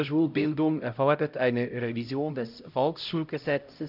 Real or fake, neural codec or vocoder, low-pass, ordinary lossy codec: fake; codec, 16 kHz, 0.5 kbps, X-Codec, HuBERT features, trained on LibriSpeech; 5.4 kHz; none